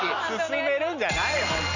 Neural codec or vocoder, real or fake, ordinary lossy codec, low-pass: none; real; none; 7.2 kHz